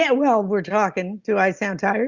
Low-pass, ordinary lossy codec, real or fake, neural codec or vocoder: 7.2 kHz; Opus, 64 kbps; real; none